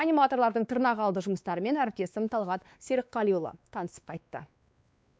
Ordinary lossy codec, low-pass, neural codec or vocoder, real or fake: none; none; codec, 16 kHz, 2 kbps, X-Codec, WavLM features, trained on Multilingual LibriSpeech; fake